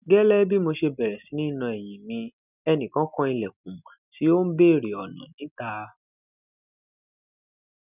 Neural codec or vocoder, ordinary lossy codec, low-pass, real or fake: none; none; 3.6 kHz; real